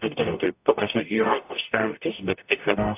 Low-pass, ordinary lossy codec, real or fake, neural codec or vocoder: 3.6 kHz; AAC, 32 kbps; fake; codec, 44.1 kHz, 0.9 kbps, DAC